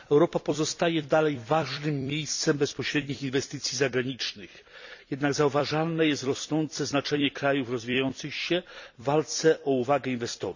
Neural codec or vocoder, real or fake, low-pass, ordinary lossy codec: vocoder, 44.1 kHz, 128 mel bands every 256 samples, BigVGAN v2; fake; 7.2 kHz; none